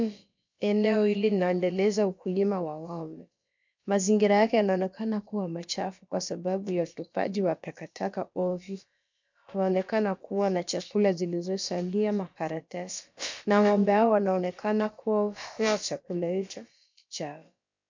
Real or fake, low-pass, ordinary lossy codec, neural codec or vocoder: fake; 7.2 kHz; MP3, 64 kbps; codec, 16 kHz, about 1 kbps, DyCAST, with the encoder's durations